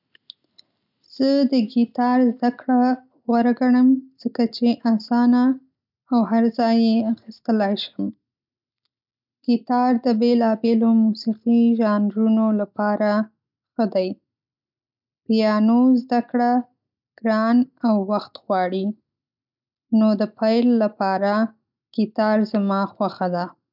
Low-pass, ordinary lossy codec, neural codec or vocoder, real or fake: 5.4 kHz; none; none; real